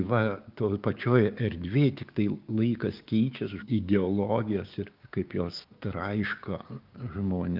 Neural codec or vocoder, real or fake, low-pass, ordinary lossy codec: codec, 16 kHz, 6 kbps, DAC; fake; 5.4 kHz; Opus, 24 kbps